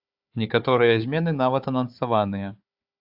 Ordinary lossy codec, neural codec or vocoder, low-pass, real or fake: AAC, 48 kbps; codec, 16 kHz, 4 kbps, FunCodec, trained on Chinese and English, 50 frames a second; 5.4 kHz; fake